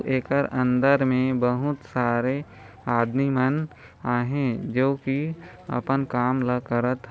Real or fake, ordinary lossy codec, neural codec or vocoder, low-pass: real; none; none; none